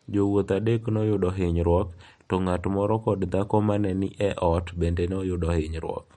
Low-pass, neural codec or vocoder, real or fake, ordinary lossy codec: 19.8 kHz; none; real; MP3, 48 kbps